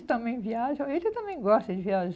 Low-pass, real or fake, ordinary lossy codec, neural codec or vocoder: none; real; none; none